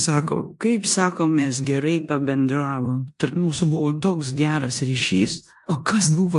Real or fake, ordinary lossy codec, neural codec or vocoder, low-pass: fake; AAC, 64 kbps; codec, 16 kHz in and 24 kHz out, 0.9 kbps, LongCat-Audio-Codec, four codebook decoder; 10.8 kHz